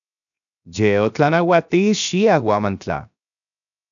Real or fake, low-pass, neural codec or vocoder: fake; 7.2 kHz; codec, 16 kHz, 0.7 kbps, FocalCodec